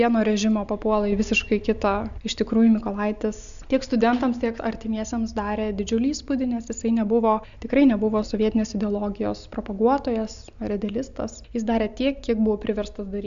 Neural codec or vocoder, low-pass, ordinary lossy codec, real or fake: none; 7.2 kHz; AAC, 96 kbps; real